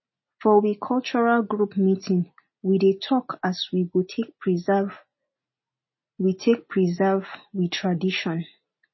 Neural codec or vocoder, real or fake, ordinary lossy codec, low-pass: none; real; MP3, 24 kbps; 7.2 kHz